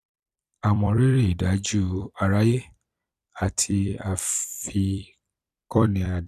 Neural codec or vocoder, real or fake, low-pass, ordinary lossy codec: vocoder, 44.1 kHz, 128 mel bands every 256 samples, BigVGAN v2; fake; 14.4 kHz; none